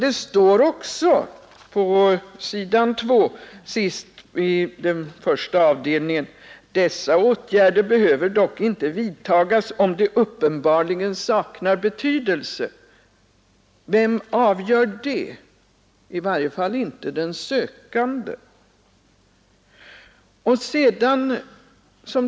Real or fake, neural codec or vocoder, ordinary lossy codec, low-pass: real; none; none; none